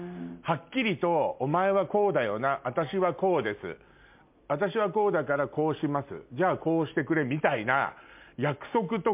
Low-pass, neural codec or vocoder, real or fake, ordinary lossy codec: 3.6 kHz; none; real; MP3, 32 kbps